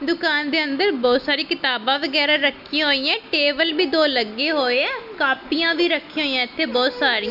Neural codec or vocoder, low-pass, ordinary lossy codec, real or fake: none; 5.4 kHz; none; real